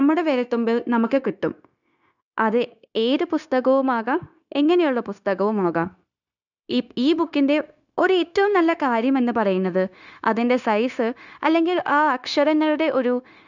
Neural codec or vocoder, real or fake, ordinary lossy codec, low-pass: codec, 16 kHz, 0.9 kbps, LongCat-Audio-Codec; fake; none; 7.2 kHz